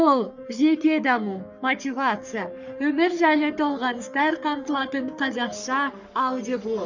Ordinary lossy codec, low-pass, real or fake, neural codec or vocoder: none; 7.2 kHz; fake; codec, 44.1 kHz, 3.4 kbps, Pupu-Codec